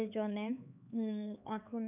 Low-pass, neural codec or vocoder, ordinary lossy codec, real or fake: 3.6 kHz; codec, 16 kHz, 4 kbps, X-Codec, HuBERT features, trained on LibriSpeech; none; fake